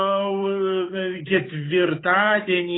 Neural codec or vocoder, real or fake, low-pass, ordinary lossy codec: codec, 16 kHz, 8 kbps, FunCodec, trained on Chinese and English, 25 frames a second; fake; 7.2 kHz; AAC, 16 kbps